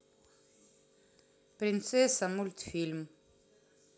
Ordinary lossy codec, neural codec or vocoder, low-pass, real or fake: none; none; none; real